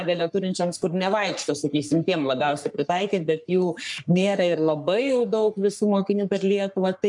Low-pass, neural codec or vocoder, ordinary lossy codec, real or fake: 10.8 kHz; codec, 44.1 kHz, 3.4 kbps, Pupu-Codec; MP3, 96 kbps; fake